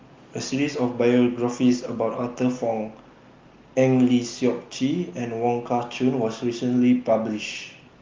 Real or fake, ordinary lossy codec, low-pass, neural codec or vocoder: real; Opus, 32 kbps; 7.2 kHz; none